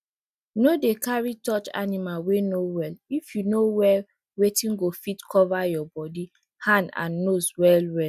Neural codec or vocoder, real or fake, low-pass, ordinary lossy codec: none; real; 14.4 kHz; none